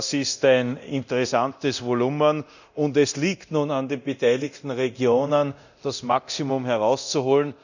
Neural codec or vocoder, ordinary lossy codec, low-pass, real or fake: codec, 24 kHz, 0.9 kbps, DualCodec; none; 7.2 kHz; fake